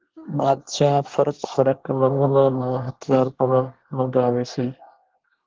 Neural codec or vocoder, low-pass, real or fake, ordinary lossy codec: codec, 24 kHz, 1 kbps, SNAC; 7.2 kHz; fake; Opus, 16 kbps